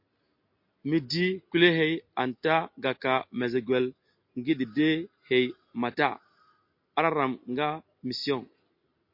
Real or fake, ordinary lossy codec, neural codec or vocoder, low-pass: real; MP3, 32 kbps; none; 5.4 kHz